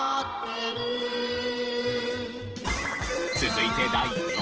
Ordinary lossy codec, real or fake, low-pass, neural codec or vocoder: Opus, 16 kbps; fake; 7.2 kHz; vocoder, 44.1 kHz, 128 mel bands every 512 samples, BigVGAN v2